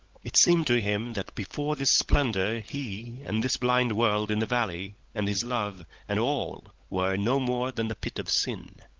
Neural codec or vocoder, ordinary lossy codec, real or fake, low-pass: codec, 16 kHz, 16 kbps, FunCodec, trained on LibriTTS, 50 frames a second; Opus, 24 kbps; fake; 7.2 kHz